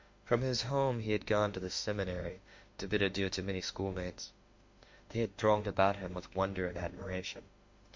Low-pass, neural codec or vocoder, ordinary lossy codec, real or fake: 7.2 kHz; autoencoder, 48 kHz, 32 numbers a frame, DAC-VAE, trained on Japanese speech; MP3, 48 kbps; fake